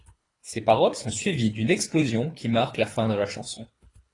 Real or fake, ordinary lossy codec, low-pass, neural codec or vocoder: fake; AAC, 32 kbps; 10.8 kHz; codec, 24 kHz, 3 kbps, HILCodec